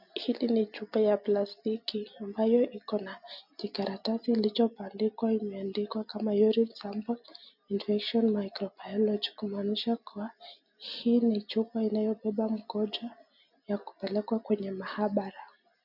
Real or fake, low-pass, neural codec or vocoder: real; 5.4 kHz; none